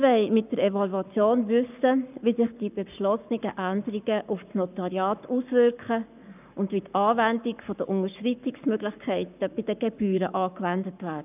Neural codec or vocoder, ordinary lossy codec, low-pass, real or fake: codec, 44.1 kHz, 7.8 kbps, Pupu-Codec; none; 3.6 kHz; fake